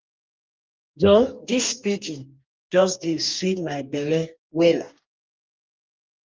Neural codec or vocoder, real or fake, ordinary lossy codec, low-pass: codec, 44.1 kHz, 2.6 kbps, DAC; fake; Opus, 32 kbps; 7.2 kHz